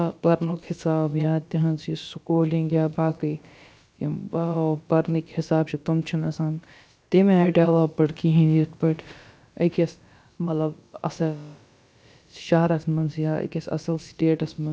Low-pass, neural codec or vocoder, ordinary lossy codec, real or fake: none; codec, 16 kHz, about 1 kbps, DyCAST, with the encoder's durations; none; fake